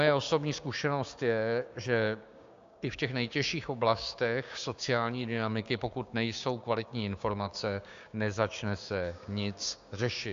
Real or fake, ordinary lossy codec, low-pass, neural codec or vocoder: fake; AAC, 64 kbps; 7.2 kHz; codec, 16 kHz, 6 kbps, DAC